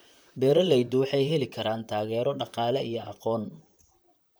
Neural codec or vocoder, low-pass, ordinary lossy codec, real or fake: vocoder, 44.1 kHz, 128 mel bands, Pupu-Vocoder; none; none; fake